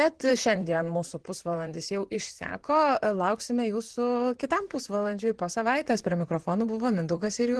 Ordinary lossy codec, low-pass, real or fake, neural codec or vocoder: Opus, 16 kbps; 10.8 kHz; fake; vocoder, 44.1 kHz, 128 mel bands, Pupu-Vocoder